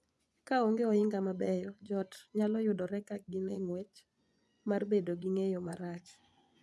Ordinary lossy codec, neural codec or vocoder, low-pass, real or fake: none; vocoder, 24 kHz, 100 mel bands, Vocos; none; fake